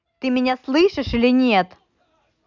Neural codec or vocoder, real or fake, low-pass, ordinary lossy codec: none; real; 7.2 kHz; none